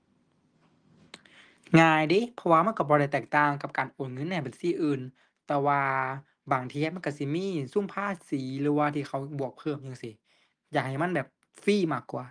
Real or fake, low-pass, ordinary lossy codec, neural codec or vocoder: real; 9.9 kHz; Opus, 32 kbps; none